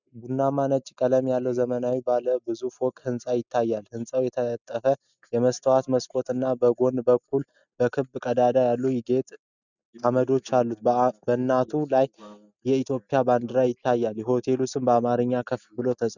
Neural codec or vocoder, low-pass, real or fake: none; 7.2 kHz; real